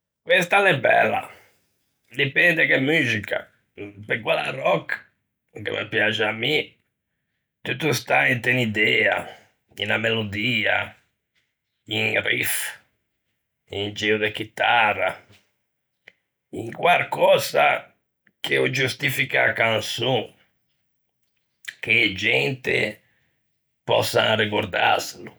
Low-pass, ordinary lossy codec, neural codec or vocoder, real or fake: none; none; none; real